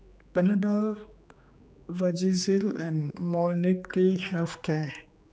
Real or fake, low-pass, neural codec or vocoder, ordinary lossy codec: fake; none; codec, 16 kHz, 2 kbps, X-Codec, HuBERT features, trained on general audio; none